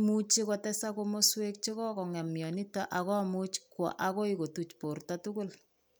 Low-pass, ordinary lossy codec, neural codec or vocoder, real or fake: none; none; none; real